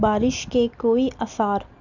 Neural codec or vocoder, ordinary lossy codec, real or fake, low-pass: none; none; real; 7.2 kHz